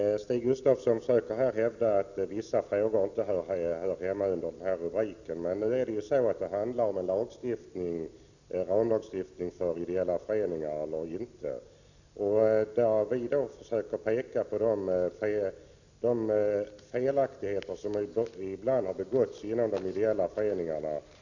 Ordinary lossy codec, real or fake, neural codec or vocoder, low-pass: none; real; none; 7.2 kHz